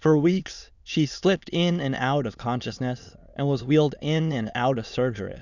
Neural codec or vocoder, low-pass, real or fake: autoencoder, 22.05 kHz, a latent of 192 numbers a frame, VITS, trained on many speakers; 7.2 kHz; fake